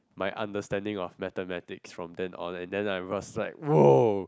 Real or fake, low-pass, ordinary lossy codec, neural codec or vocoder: real; none; none; none